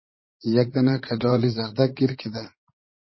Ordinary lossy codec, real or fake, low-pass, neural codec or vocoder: MP3, 24 kbps; fake; 7.2 kHz; vocoder, 44.1 kHz, 80 mel bands, Vocos